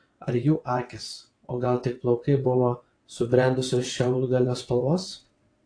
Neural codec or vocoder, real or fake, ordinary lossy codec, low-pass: vocoder, 22.05 kHz, 80 mel bands, WaveNeXt; fake; AAC, 48 kbps; 9.9 kHz